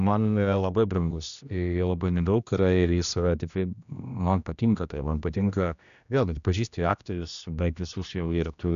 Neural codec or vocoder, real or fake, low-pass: codec, 16 kHz, 1 kbps, X-Codec, HuBERT features, trained on general audio; fake; 7.2 kHz